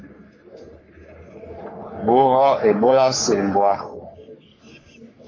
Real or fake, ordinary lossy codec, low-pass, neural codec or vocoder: fake; AAC, 32 kbps; 7.2 kHz; codec, 44.1 kHz, 3.4 kbps, Pupu-Codec